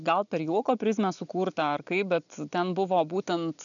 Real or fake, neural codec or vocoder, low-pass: real; none; 7.2 kHz